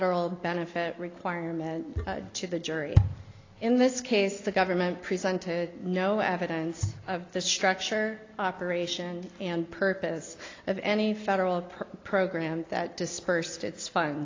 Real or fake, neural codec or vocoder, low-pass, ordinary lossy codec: real; none; 7.2 kHz; AAC, 32 kbps